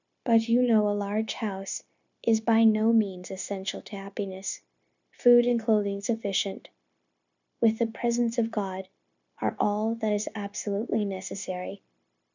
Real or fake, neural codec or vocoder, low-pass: fake; codec, 16 kHz, 0.9 kbps, LongCat-Audio-Codec; 7.2 kHz